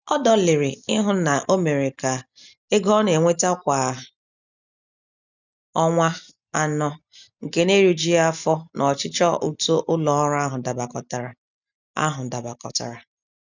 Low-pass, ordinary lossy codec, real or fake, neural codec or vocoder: 7.2 kHz; none; real; none